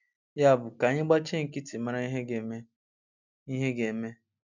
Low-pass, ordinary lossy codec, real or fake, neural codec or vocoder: 7.2 kHz; none; real; none